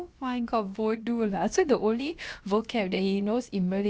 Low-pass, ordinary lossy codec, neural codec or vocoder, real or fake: none; none; codec, 16 kHz, about 1 kbps, DyCAST, with the encoder's durations; fake